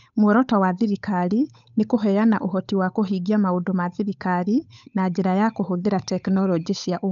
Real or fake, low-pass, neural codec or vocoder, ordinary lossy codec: fake; 7.2 kHz; codec, 16 kHz, 8 kbps, FunCodec, trained on Chinese and English, 25 frames a second; none